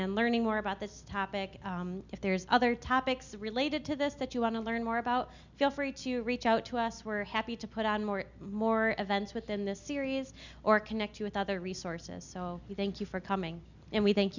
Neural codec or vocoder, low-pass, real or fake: none; 7.2 kHz; real